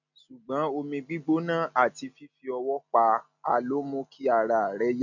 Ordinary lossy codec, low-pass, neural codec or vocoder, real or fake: none; 7.2 kHz; none; real